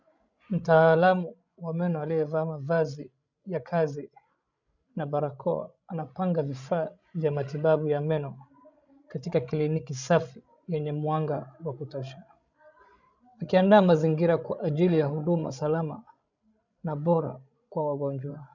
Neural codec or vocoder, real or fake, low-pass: codec, 16 kHz, 16 kbps, FreqCodec, larger model; fake; 7.2 kHz